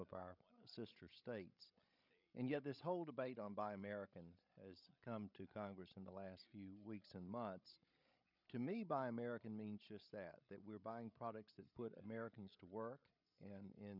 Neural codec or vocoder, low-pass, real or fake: codec, 16 kHz, 16 kbps, FreqCodec, larger model; 5.4 kHz; fake